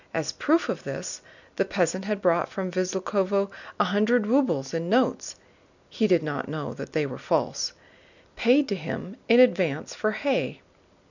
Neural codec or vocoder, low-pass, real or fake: none; 7.2 kHz; real